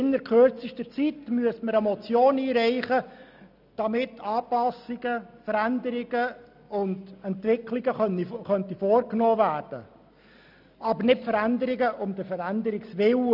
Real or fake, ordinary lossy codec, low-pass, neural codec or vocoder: real; none; 5.4 kHz; none